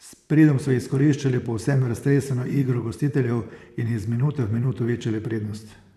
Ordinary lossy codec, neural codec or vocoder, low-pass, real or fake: none; vocoder, 44.1 kHz, 128 mel bands, Pupu-Vocoder; 14.4 kHz; fake